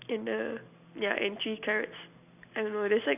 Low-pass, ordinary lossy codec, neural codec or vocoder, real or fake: 3.6 kHz; none; none; real